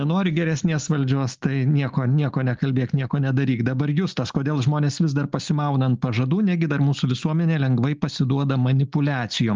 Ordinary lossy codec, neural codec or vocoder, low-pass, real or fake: Opus, 32 kbps; none; 7.2 kHz; real